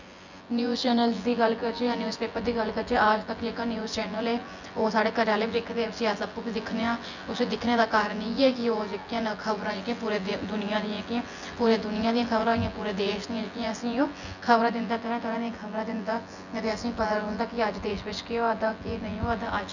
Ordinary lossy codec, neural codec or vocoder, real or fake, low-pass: none; vocoder, 24 kHz, 100 mel bands, Vocos; fake; 7.2 kHz